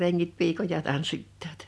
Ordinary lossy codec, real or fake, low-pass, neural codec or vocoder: none; real; none; none